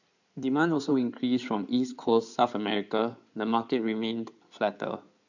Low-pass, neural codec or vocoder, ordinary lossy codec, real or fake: 7.2 kHz; codec, 16 kHz in and 24 kHz out, 2.2 kbps, FireRedTTS-2 codec; none; fake